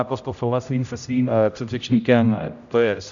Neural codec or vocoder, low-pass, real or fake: codec, 16 kHz, 0.5 kbps, X-Codec, HuBERT features, trained on general audio; 7.2 kHz; fake